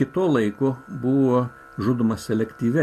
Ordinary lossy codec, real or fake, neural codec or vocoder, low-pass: MP3, 64 kbps; real; none; 14.4 kHz